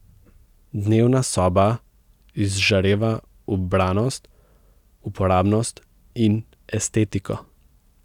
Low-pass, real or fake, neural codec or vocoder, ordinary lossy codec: 19.8 kHz; real; none; none